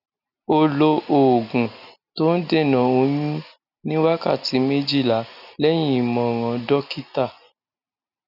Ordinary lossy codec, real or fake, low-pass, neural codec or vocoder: none; real; 5.4 kHz; none